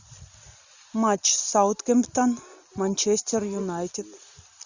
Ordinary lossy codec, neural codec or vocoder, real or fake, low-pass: Opus, 64 kbps; none; real; 7.2 kHz